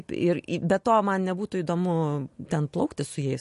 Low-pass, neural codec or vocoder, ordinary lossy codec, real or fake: 14.4 kHz; none; MP3, 48 kbps; real